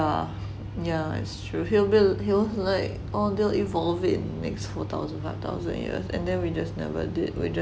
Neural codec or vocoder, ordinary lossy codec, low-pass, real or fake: none; none; none; real